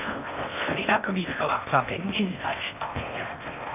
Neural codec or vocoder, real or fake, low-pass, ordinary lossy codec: codec, 16 kHz in and 24 kHz out, 0.6 kbps, FocalCodec, streaming, 4096 codes; fake; 3.6 kHz; none